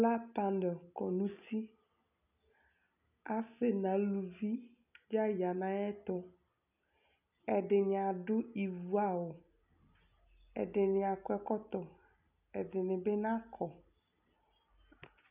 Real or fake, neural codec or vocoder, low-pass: real; none; 3.6 kHz